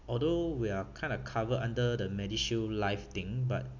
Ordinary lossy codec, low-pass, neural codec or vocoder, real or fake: none; 7.2 kHz; none; real